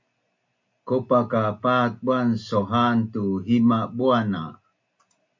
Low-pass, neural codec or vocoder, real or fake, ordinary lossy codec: 7.2 kHz; none; real; MP3, 48 kbps